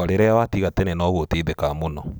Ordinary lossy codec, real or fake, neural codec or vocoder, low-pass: none; real; none; none